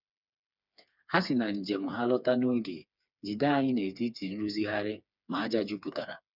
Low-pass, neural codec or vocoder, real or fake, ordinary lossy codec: 5.4 kHz; codec, 16 kHz, 4 kbps, FreqCodec, smaller model; fake; none